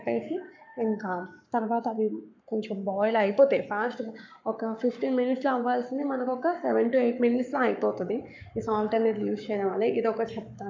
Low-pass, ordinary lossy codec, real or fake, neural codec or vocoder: 7.2 kHz; MP3, 64 kbps; fake; codec, 44.1 kHz, 7.8 kbps, Pupu-Codec